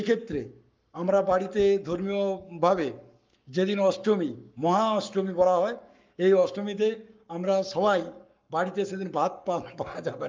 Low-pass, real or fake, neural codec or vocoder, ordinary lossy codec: 7.2 kHz; fake; codec, 44.1 kHz, 7.8 kbps, Pupu-Codec; Opus, 24 kbps